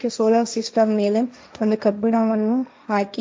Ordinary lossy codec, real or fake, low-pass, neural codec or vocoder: none; fake; none; codec, 16 kHz, 1.1 kbps, Voila-Tokenizer